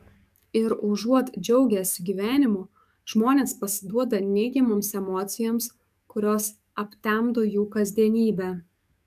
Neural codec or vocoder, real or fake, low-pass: codec, 44.1 kHz, 7.8 kbps, DAC; fake; 14.4 kHz